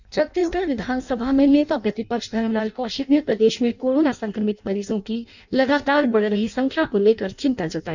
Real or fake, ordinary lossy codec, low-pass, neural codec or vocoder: fake; none; 7.2 kHz; codec, 16 kHz in and 24 kHz out, 0.6 kbps, FireRedTTS-2 codec